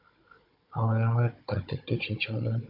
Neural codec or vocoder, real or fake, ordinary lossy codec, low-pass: codec, 16 kHz, 16 kbps, FunCodec, trained on Chinese and English, 50 frames a second; fake; AAC, 48 kbps; 5.4 kHz